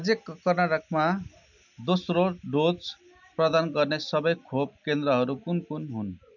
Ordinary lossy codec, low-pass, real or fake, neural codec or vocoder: none; 7.2 kHz; real; none